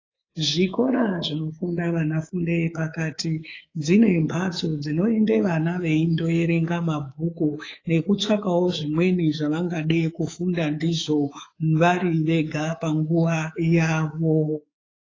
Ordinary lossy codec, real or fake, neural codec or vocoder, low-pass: AAC, 32 kbps; fake; codec, 24 kHz, 3.1 kbps, DualCodec; 7.2 kHz